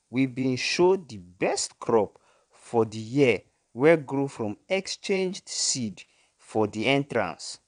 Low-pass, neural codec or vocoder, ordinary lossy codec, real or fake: 9.9 kHz; vocoder, 22.05 kHz, 80 mel bands, WaveNeXt; none; fake